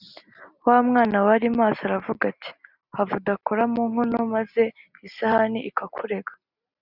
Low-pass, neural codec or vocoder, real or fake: 5.4 kHz; none; real